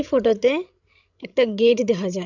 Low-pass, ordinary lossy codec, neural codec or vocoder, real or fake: 7.2 kHz; none; codec, 16 kHz, 16 kbps, FreqCodec, larger model; fake